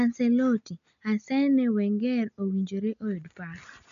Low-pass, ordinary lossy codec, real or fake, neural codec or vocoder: 7.2 kHz; none; real; none